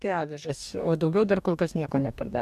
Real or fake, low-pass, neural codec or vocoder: fake; 14.4 kHz; codec, 44.1 kHz, 2.6 kbps, DAC